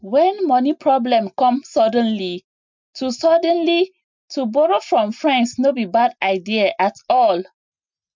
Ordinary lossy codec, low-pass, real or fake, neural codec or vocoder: MP3, 64 kbps; 7.2 kHz; real; none